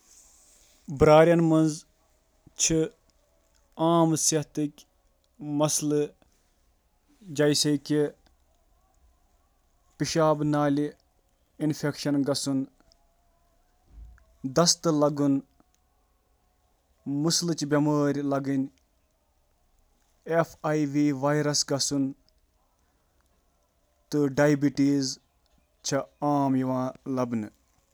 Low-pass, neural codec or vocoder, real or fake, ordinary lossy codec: none; none; real; none